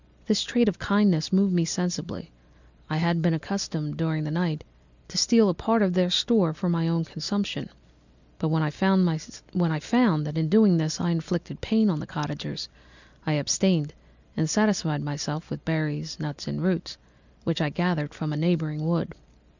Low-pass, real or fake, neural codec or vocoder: 7.2 kHz; real; none